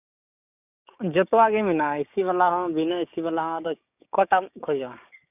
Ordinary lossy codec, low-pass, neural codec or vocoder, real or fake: none; 3.6 kHz; codec, 16 kHz, 6 kbps, DAC; fake